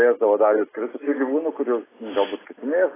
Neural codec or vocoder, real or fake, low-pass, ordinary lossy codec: none; real; 3.6 kHz; AAC, 16 kbps